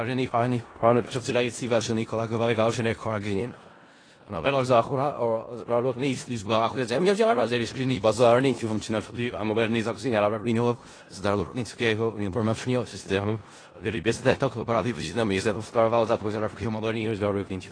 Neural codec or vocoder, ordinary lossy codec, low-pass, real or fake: codec, 16 kHz in and 24 kHz out, 0.4 kbps, LongCat-Audio-Codec, four codebook decoder; AAC, 32 kbps; 9.9 kHz; fake